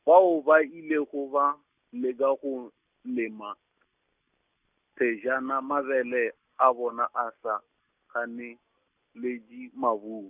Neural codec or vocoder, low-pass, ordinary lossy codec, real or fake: none; 3.6 kHz; none; real